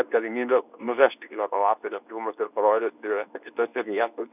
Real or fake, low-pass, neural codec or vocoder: fake; 3.6 kHz; codec, 24 kHz, 0.9 kbps, WavTokenizer, medium speech release version 2